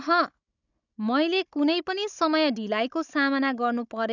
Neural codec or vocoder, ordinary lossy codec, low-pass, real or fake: codec, 16 kHz, 16 kbps, FunCodec, trained on Chinese and English, 50 frames a second; none; 7.2 kHz; fake